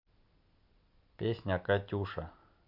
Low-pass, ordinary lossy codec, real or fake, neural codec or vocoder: 5.4 kHz; AAC, 48 kbps; real; none